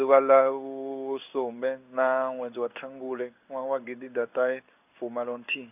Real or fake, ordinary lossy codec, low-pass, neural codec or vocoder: fake; none; 3.6 kHz; codec, 16 kHz in and 24 kHz out, 1 kbps, XY-Tokenizer